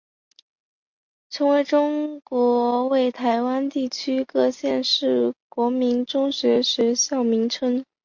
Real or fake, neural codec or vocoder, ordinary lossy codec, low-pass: real; none; MP3, 48 kbps; 7.2 kHz